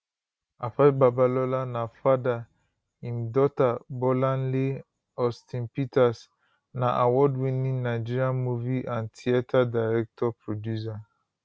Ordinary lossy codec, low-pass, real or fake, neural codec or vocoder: none; none; real; none